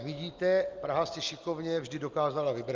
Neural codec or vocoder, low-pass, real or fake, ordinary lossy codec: none; 7.2 kHz; real; Opus, 24 kbps